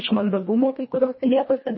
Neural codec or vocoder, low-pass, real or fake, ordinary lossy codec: codec, 24 kHz, 1.5 kbps, HILCodec; 7.2 kHz; fake; MP3, 24 kbps